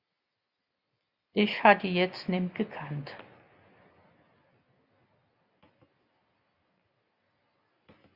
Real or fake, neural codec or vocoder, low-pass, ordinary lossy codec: real; none; 5.4 kHz; Opus, 64 kbps